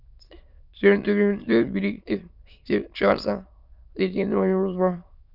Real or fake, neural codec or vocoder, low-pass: fake; autoencoder, 22.05 kHz, a latent of 192 numbers a frame, VITS, trained on many speakers; 5.4 kHz